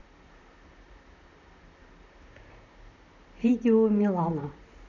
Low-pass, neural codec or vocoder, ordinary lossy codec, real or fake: 7.2 kHz; vocoder, 44.1 kHz, 128 mel bands, Pupu-Vocoder; none; fake